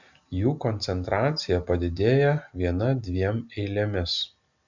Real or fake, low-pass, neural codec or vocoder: real; 7.2 kHz; none